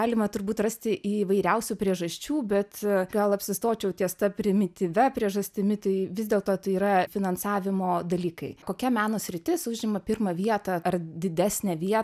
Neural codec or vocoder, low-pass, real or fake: none; 14.4 kHz; real